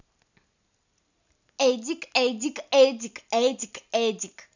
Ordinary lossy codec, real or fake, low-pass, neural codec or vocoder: none; real; 7.2 kHz; none